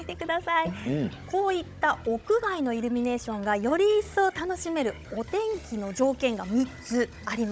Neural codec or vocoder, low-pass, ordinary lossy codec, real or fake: codec, 16 kHz, 16 kbps, FunCodec, trained on Chinese and English, 50 frames a second; none; none; fake